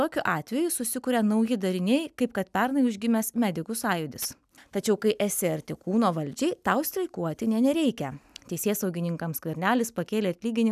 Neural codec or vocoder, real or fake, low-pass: none; real; 14.4 kHz